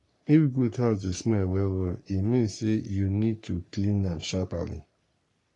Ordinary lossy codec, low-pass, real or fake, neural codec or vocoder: AAC, 48 kbps; 10.8 kHz; fake; codec, 44.1 kHz, 3.4 kbps, Pupu-Codec